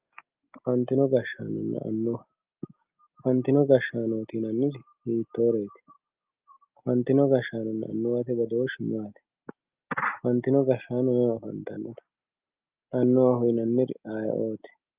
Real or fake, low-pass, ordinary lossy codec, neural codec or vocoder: real; 3.6 kHz; Opus, 32 kbps; none